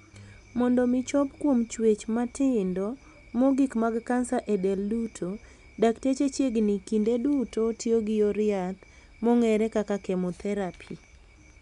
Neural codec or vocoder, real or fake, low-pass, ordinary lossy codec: none; real; 10.8 kHz; none